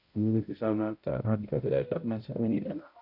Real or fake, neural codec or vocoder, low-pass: fake; codec, 16 kHz, 0.5 kbps, X-Codec, HuBERT features, trained on balanced general audio; 5.4 kHz